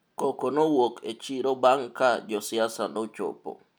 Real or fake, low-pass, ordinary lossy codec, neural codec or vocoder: real; none; none; none